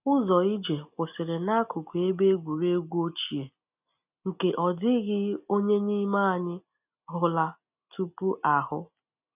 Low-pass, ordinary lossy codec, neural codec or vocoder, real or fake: 3.6 kHz; none; none; real